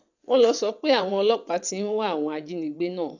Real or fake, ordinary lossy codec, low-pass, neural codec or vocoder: fake; none; 7.2 kHz; vocoder, 22.05 kHz, 80 mel bands, WaveNeXt